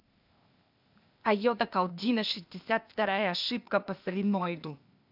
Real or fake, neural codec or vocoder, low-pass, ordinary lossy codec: fake; codec, 16 kHz, 0.8 kbps, ZipCodec; 5.4 kHz; none